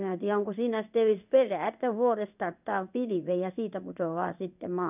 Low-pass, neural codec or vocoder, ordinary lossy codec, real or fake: 3.6 kHz; codec, 16 kHz in and 24 kHz out, 1 kbps, XY-Tokenizer; none; fake